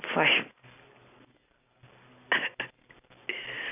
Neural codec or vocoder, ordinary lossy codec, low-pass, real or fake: none; none; 3.6 kHz; real